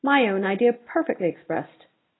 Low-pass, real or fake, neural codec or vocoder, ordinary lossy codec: 7.2 kHz; real; none; AAC, 16 kbps